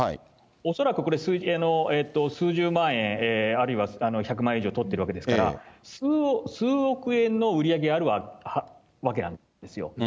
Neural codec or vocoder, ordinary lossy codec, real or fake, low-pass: none; none; real; none